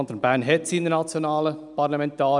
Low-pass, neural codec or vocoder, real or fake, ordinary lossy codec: 10.8 kHz; none; real; none